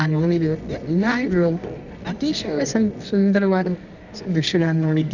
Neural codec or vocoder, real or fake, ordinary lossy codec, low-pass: codec, 24 kHz, 0.9 kbps, WavTokenizer, medium music audio release; fake; none; 7.2 kHz